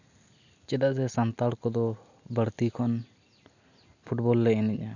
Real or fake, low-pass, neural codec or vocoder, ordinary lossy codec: real; 7.2 kHz; none; none